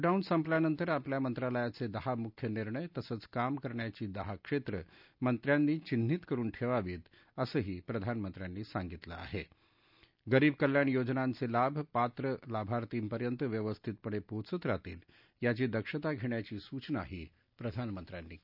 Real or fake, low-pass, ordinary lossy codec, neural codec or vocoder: real; 5.4 kHz; none; none